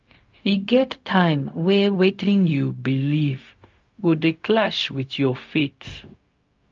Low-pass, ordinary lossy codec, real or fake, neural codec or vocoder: 7.2 kHz; Opus, 24 kbps; fake; codec, 16 kHz, 0.4 kbps, LongCat-Audio-Codec